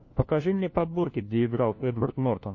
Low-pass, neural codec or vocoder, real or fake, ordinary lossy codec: 7.2 kHz; codec, 16 kHz, 1 kbps, FunCodec, trained on LibriTTS, 50 frames a second; fake; MP3, 32 kbps